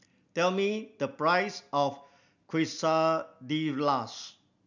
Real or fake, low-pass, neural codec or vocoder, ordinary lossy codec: real; 7.2 kHz; none; none